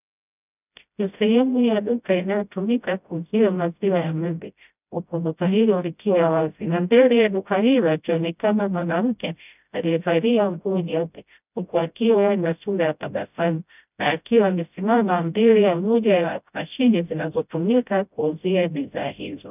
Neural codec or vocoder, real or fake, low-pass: codec, 16 kHz, 0.5 kbps, FreqCodec, smaller model; fake; 3.6 kHz